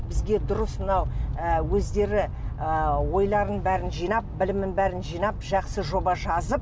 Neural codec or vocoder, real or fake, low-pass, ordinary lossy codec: none; real; none; none